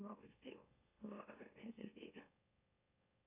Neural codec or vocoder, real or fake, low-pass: autoencoder, 44.1 kHz, a latent of 192 numbers a frame, MeloTTS; fake; 3.6 kHz